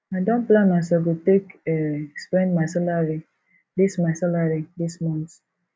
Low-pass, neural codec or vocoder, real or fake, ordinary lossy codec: none; none; real; none